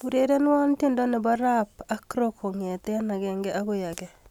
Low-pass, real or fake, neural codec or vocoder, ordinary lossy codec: 19.8 kHz; real; none; none